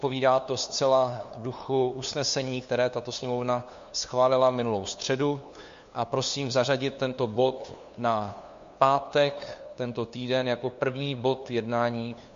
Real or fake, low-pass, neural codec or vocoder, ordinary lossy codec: fake; 7.2 kHz; codec, 16 kHz, 2 kbps, FunCodec, trained on LibriTTS, 25 frames a second; MP3, 48 kbps